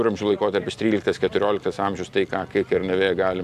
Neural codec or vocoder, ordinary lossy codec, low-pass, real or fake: none; AAC, 96 kbps; 14.4 kHz; real